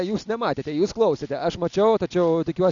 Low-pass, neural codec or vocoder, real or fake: 7.2 kHz; none; real